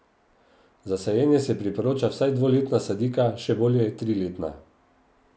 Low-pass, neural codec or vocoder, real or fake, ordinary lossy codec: none; none; real; none